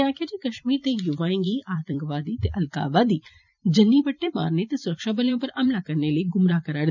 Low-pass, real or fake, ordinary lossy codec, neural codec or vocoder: 7.2 kHz; fake; none; vocoder, 44.1 kHz, 128 mel bands every 256 samples, BigVGAN v2